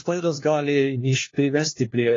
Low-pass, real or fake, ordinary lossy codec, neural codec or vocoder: 7.2 kHz; fake; AAC, 32 kbps; codec, 16 kHz, 1 kbps, FunCodec, trained on LibriTTS, 50 frames a second